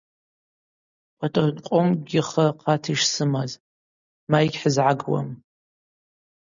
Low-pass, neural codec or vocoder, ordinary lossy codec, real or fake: 7.2 kHz; none; MP3, 96 kbps; real